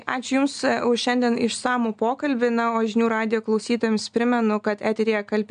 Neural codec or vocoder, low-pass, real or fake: none; 9.9 kHz; real